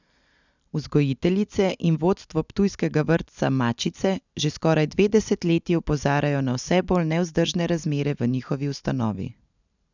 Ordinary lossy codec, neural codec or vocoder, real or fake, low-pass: none; none; real; 7.2 kHz